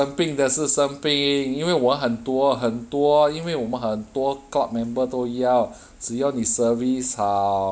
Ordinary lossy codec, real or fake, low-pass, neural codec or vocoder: none; real; none; none